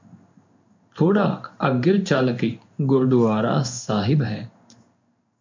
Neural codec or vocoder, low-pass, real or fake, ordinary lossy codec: codec, 16 kHz in and 24 kHz out, 1 kbps, XY-Tokenizer; 7.2 kHz; fake; MP3, 64 kbps